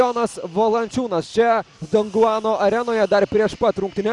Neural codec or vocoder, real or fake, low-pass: none; real; 10.8 kHz